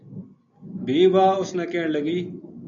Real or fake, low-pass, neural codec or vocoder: real; 7.2 kHz; none